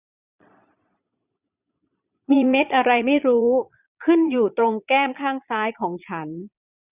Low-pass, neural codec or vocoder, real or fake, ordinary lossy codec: 3.6 kHz; vocoder, 22.05 kHz, 80 mel bands, Vocos; fake; none